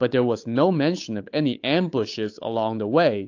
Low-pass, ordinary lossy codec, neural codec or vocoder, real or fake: 7.2 kHz; AAC, 48 kbps; codec, 16 kHz, 8 kbps, FunCodec, trained on Chinese and English, 25 frames a second; fake